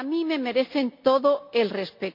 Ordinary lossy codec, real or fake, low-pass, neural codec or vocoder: MP3, 48 kbps; real; 5.4 kHz; none